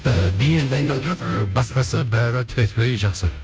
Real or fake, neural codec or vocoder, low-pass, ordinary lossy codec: fake; codec, 16 kHz, 0.5 kbps, FunCodec, trained on Chinese and English, 25 frames a second; none; none